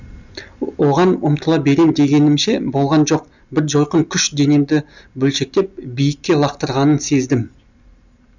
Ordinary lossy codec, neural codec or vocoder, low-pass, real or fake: none; none; 7.2 kHz; real